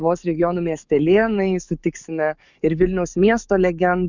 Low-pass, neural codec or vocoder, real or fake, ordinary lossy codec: 7.2 kHz; codec, 44.1 kHz, 7.8 kbps, DAC; fake; Opus, 64 kbps